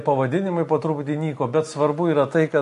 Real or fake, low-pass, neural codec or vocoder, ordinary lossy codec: real; 14.4 kHz; none; MP3, 48 kbps